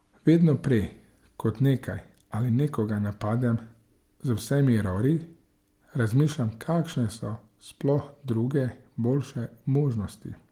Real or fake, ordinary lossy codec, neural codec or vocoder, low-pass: real; Opus, 24 kbps; none; 19.8 kHz